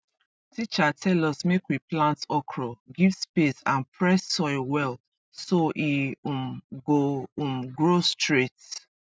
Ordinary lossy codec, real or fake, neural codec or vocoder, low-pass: none; real; none; none